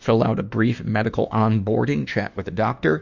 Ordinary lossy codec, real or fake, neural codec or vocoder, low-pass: Opus, 64 kbps; fake; autoencoder, 48 kHz, 32 numbers a frame, DAC-VAE, trained on Japanese speech; 7.2 kHz